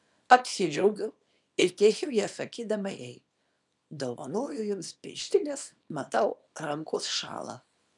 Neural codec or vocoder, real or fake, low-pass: codec, 24 kHz, 0.9 kbps, WavTokenizer, small release; fake; 10.8 kHz